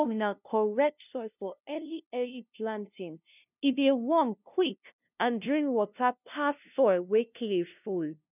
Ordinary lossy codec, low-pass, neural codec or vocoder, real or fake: none; 3.6 kHz; codec, 16 kHz, 0.5 kbps, FunCodec, trained on LibriTTS, 25 frames a second; fake